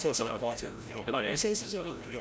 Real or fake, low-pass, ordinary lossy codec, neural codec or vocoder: fake; none; none; codec, 16 kHz, 0.5 kbps, FreqCodec, larger model